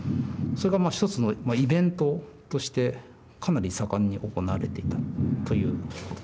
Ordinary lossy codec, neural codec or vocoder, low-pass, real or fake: none; none; none; real